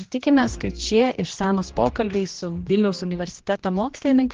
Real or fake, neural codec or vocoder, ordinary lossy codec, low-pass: fake; codec, 16 kHz, 1 kbps, X-Codec, HuBERT features, trained on general audio; Opus, 16 kbps; 7.2 kHz